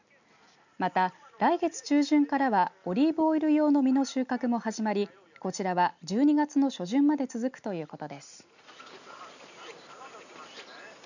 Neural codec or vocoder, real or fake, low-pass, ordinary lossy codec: none; real; 7.2 kHz; none